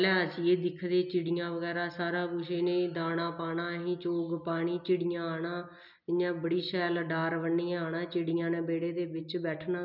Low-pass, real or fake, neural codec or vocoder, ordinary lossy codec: 5.4 kHz; real; none; none